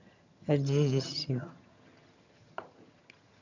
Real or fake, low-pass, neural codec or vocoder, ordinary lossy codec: fake; 7.2 kHz; vocoder, 22.05 kHz, 80 mel bands, HiFi-GAN; none